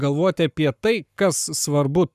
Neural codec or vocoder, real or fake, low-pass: codec, 44.1 kHz, 7.8 kbps, Pupu-Codec; fake; 14.4 kHz